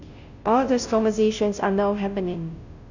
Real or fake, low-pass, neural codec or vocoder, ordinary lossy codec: fake; 7.2 kHz; codec, 16 kHz, 0.5 kbps, FunCodec, trained on Chinese and English, 25 frames a second; none